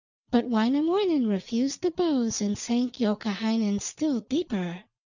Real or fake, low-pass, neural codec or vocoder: real; 7.2 kHz; none